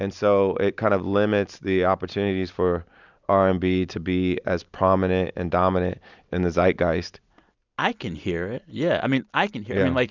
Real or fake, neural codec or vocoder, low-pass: real; none; 7.2 kHz